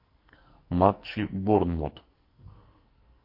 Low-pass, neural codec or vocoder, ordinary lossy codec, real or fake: 5.4 kHz; codec, 44.1 kHz, 2.6 kbps, SNAC; MP3, 32 kbps; fake